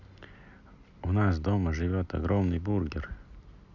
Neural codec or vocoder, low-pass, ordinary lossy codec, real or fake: none; 7.2 kHz; Opus, 64 kbps; real